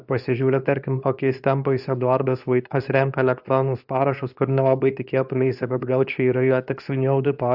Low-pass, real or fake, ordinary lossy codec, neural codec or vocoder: 5.4 kHz; fake; AAC, 48 kbps; codec, 24 kHz, 0.9 kbps, WavTokenizer, medium speech release version 2